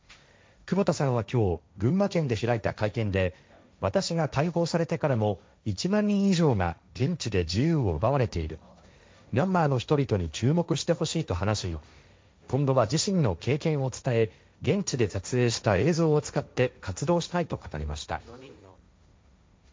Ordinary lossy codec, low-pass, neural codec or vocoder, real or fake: none; none; codec, 16 kHz, 1.1 kbps, Voila-Tokenizer; fake